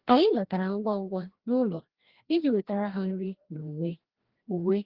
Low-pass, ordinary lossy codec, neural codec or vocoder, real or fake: 5.4 kHz; Opus, 16 kbps; codec, 16 kHz, 1 kbps, FreqCodec, larger model; fake